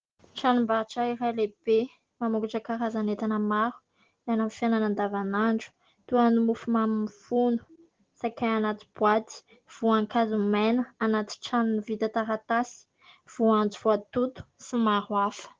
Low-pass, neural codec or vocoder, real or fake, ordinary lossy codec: 7.2 kHz; none; real; Opus, 16 kbps